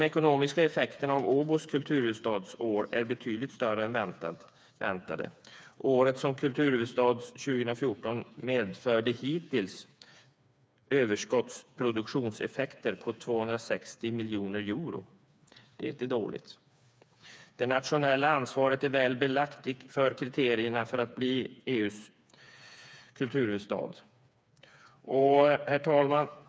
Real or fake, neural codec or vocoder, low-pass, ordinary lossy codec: fake; codec, 16 kHz, 4 kbps, FreqCodec, smaller model; none; none